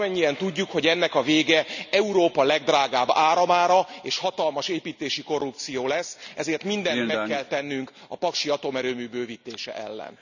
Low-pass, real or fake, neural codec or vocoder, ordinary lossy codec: 7.2 kHz; real; none; none